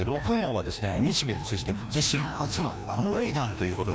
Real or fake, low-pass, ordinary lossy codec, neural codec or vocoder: fake; none; none; codec, 16 kHz, 1 kbps, FreqCodec, larger model